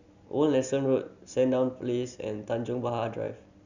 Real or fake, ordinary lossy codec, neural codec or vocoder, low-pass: real; none; none; 7.2 kHz